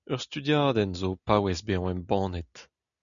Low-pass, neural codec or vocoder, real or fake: 7.2 kHz; none; real